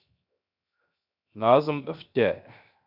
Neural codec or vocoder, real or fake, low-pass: codec, 16 kHz, 0.7 kbps, FocalCodec; fake; 5.4 kHz